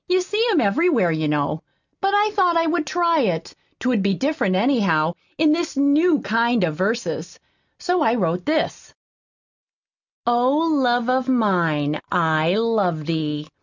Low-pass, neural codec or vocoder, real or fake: 7.2 kHz; none; real